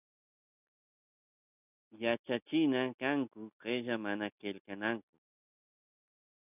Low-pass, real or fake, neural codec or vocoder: 3.6 kHz; real; none